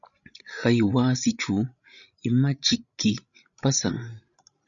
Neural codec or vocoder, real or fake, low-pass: codec, 16 kHz, 16 kbps, FreqCodec, larger model; fake; 7.2 kHz